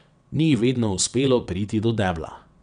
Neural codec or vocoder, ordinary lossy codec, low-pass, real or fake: vocoder, 22.05 kHz, 80 mel bands, WaveNeXt; MP3, 96 kbps; 9.9 kHz; fake